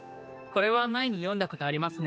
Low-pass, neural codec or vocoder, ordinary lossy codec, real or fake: none; codec, 16 kHz, 2 kbps, X-Codec, HuBERT features, trained on general audio; none; fake